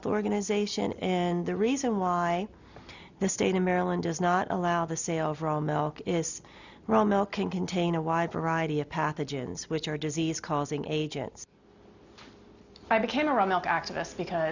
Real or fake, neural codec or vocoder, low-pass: real; none; 7.2 kHz